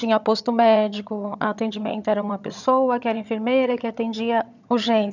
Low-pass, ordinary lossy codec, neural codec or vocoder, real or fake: 7.2 kHz; none; vocoder, 22.05 kHz, 80 mel bands, HiFi-GAN; fake